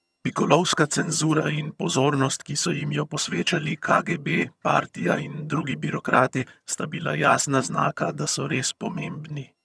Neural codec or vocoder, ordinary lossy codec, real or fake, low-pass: vocoder, 22.05 kHz, 80 mel bands, HiFi-GAN; none; fake; none